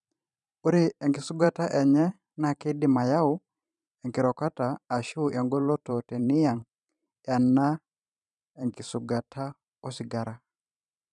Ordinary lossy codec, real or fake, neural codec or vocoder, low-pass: none; fake; vocoder, 44.1 kHz, 128 mel bands every 512 samples, BigVGAN v2; 10.8 kHz